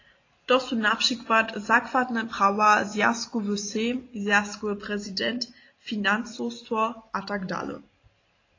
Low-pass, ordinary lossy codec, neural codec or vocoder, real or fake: 7.2 kHz; AAC, 32 kbps; none; real